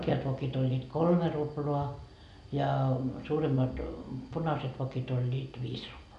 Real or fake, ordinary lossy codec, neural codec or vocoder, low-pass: real; none; none; 10.8 kHz